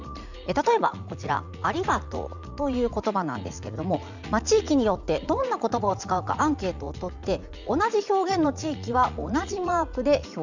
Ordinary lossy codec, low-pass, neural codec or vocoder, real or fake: none; 7.2 kHz; vocoder, 44.1 kHz, 80 mel bands, Vocos; fake